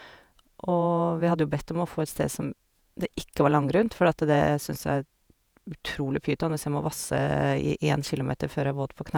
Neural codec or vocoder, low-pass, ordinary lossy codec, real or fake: vocoder, 48 kHz, 128 mel bands, Vocos; none; none; fake